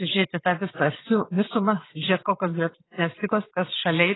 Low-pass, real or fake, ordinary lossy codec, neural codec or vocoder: 7.2 kHz; fake; AAC, 16 kbps; vocoder, 22.05 kHz, 80 mel bands, Vocos